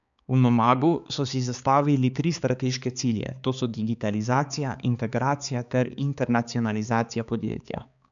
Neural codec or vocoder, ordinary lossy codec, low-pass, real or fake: codec, 16 kHz, 4 kbps, X-Codec, HuBERT features, trained on balanced general audio; none; 7.2 kHz; fake